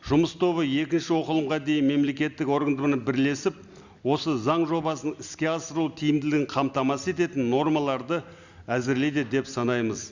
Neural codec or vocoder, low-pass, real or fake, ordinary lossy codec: none; 7.2 kHz; real; Opus, 64 kbps